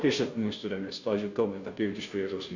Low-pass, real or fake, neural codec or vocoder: 7.2 kHz; fake; codec, 16 kHz, 0.5 kbps, FunCodec, trained on Chinese and English, 25 frames a second